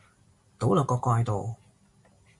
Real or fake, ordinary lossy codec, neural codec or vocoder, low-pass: real; MP3, 96 kbps; none; 10.8 kHz